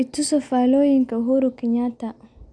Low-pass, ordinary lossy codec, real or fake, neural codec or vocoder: 9.9 kHz; none; real; none